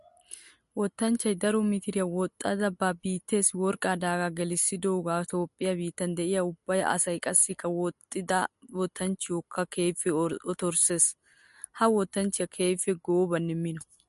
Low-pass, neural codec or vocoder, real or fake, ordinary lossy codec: 14.4 kHz; none; real; MP3, 48 kbps